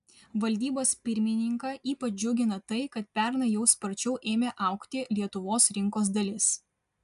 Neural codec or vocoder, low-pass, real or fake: none; 10.8 kHz; real